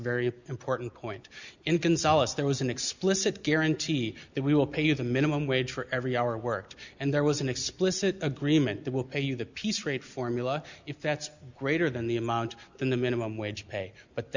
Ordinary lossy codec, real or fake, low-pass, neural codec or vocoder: Opus, 64 kbps; real; 7.2 kHz; none